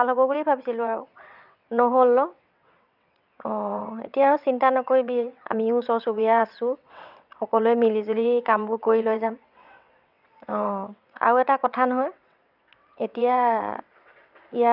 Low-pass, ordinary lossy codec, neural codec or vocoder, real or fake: 5.4 kHz; none; vocoder, 22.05 kHz, 80 mel bands, WaveNeXt; fake